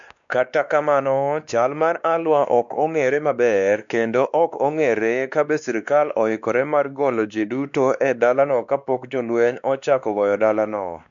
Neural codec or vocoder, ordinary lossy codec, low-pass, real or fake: codec, 16 kHz, 2 kbps, X-Codec, WavLM features, trained on Multilingual LibriSpeech; none; 7.2 kHz; fake